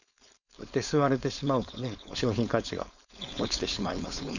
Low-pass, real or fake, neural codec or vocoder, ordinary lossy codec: 7.2 kHz; fake; codec, 16 kHz, 4.8 kbps, FACodec; none